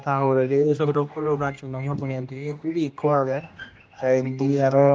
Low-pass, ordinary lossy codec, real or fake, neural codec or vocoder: none; none; fake; codec, 16 kHz, 1 kbps, X-Codec, HuBERT features, trained on general audio